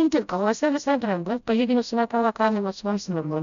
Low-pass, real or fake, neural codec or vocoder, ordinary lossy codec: 7.2 kHz; fake; codec, 16 kHz, 0.5 kbps, FreqCodec, smaller model; MP3, 96 kbps